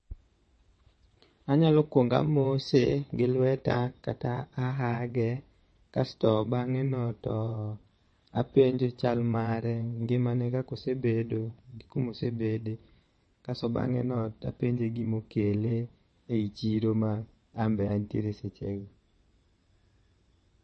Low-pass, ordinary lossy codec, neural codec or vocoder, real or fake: 9.9 kHz; MP3, 32 kbps; vocoder, 22.05 kHz, 80 mel bands, WaveNeXt; fake